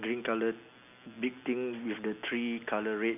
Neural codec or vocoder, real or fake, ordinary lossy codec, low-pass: none; real; none; 3.6 kHz